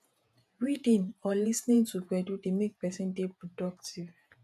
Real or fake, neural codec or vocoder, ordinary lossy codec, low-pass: fake; vocoder, 48 kHz, 128 mel bands, Vocos; none; 14.4 kHz